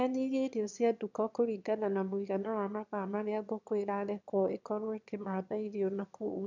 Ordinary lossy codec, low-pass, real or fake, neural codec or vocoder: none; 7.2 kHz; fake; autoencoder, 22.05 kHz, a latent of 192 numbers a frame, VITS, trained on one speaker